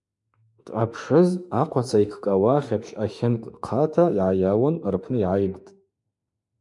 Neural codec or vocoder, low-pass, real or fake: autoencoder, 48 kHz, 32 numbers a frame, DAC-VAE, trained on Japanese speech; 10.8 kHz; fake